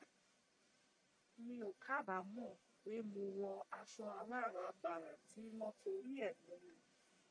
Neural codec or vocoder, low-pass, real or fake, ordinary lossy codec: codec, 44.1 kHz, 1.7 kbps, Pupu-Codec; 9.9 kHz; fake; MP3, 96 kbps